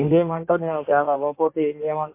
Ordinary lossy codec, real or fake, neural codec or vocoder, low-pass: MP3, 24 kbps; fake; codec, 16 kHz in and 24 kHz out, 1.1 kbps, FireRedTTS-2 codec; 3.6 kHz